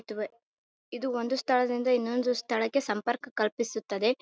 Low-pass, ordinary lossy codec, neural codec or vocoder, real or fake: none; none; none; real